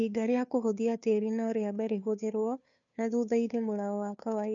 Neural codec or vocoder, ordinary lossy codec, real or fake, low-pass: codec, 16 kHz, 2 kbps, FunCodec, trained on Chinese and English, 25 frames a second; none; fake; 7.2 kHz